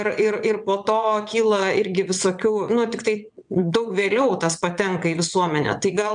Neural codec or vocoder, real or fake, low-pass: vocoder, 22.05 kHz, 80 mel bands, WaveNeXt; fake; 9.9 kHz